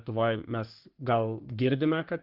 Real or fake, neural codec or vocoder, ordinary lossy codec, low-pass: fake; codec, 44.1 kHz, 3.4 kbps, Pupu-Codec; Opus, 16 kbps; 5.4 kHz